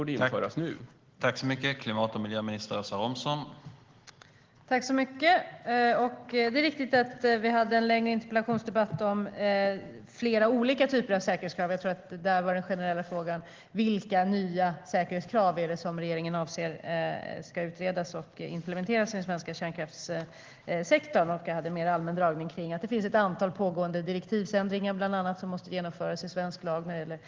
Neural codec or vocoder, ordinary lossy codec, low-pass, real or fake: none; Opus, 16 kbps; 7.2 kHz; real